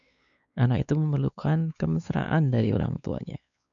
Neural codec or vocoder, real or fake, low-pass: codec, 16 kHz, 4 kbps, X-Codec, WavLM features, trained on Multilingual LibriSpeech; fake; 7.2 kHz